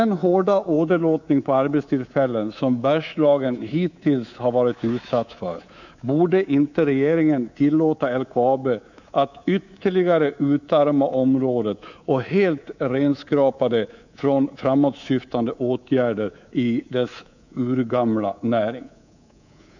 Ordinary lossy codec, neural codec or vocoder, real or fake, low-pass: none; codec, 24 kHz, 3.1 kbps, DualCodec; fake; 7.2 kHz